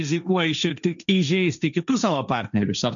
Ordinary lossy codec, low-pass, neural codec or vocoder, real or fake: MP3, 96 kbps; 7.2 kHz; codec, 16 kHz, 1.1 kbps, Voila-Tokenizer; fake